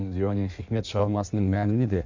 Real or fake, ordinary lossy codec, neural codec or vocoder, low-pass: fake; none; codec, 16 kHz in and 24 kHz out, 1.1 kbps, FireRedTTS-2 codec; 7.2 kHz